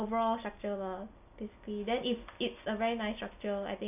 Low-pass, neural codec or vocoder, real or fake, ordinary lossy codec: 3.6 kHz; none; real; none